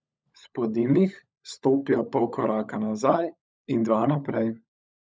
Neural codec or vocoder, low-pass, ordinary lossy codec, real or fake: codec, 16 kHz, 16 kbps, FunCodec, trained on LibriTTS, 50 frames a second; none; none; fake